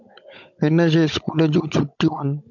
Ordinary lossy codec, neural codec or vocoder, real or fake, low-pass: MP3, 64 kbps; codec, 16 kHz, 16 kbps, FunCodec, trained on Chinese and English, 50 frames a second; fake; 7.2 kHz